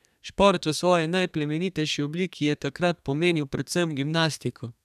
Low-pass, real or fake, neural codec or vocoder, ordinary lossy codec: 14.4 kHz; fake; codec, 32 kHz, 1.9 kbps, SNAC; none